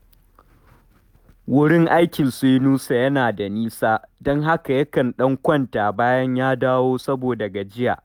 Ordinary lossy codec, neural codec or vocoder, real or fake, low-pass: none; none; real; 19.8 kHz